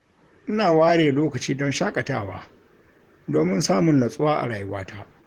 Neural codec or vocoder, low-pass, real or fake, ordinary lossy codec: vocoder, 44.1 kHz, 128 mel bands, Pupu-Vocoder; 19.8 kHz; fake; Opus, 16 kbps